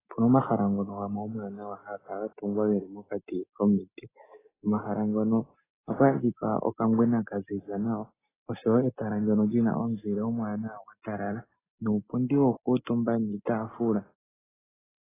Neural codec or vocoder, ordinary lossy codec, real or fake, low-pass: none; AAC, 16 kbps; real; 3.6 kHz